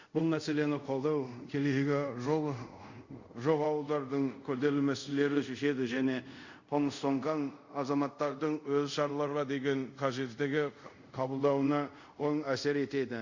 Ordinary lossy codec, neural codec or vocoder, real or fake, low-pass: Opus, 64 kbps; codec, 24 kHz, 0.5 kbps, DualCodec; fake; 7.2 kHz